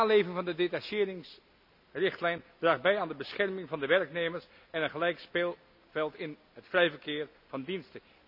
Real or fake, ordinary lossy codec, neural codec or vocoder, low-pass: real; none; none; 5.4 kHz